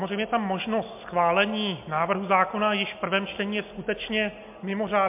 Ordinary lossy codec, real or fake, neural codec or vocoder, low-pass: MP3, 32 kbps; real; none; 3.6 kHz